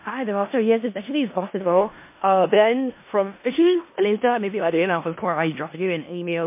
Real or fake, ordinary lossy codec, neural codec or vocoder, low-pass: fake; MP3, 24 kbps; codec, 16 kHz in and 24 kHz out, 0.4 kbps, LongCat-Audio-Codec, four codebook decoder; 3.6 kHz